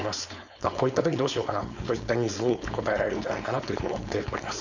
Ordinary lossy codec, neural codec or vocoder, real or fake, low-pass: none; codec, 16 kHz, 4.8 kbps, FACodec; fake; 7.2 kHz